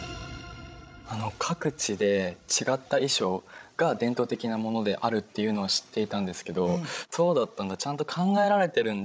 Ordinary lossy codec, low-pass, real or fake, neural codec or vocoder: none; none; fake; codec, 16 kHz, 16 kbps, FreqCodec, larger model